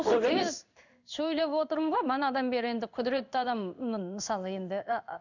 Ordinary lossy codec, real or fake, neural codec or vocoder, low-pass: none; fake; codec, 16 kHz in and 24 kHz out, 1 kbps, XY-Tokenizer; 7.2 kHz